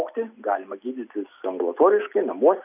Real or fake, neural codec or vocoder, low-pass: real; none; 3.6 kHz